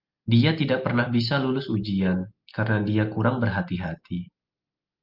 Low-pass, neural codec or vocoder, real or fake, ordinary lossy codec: 5.4 kHz; none; real; Opus, 32 kbps